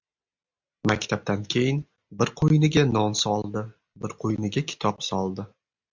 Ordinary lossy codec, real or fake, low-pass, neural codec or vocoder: MP3, 64 kbps; real; 7.2 kHz; none